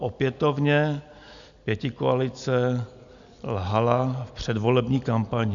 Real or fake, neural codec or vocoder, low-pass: real; none; 7.2 kHz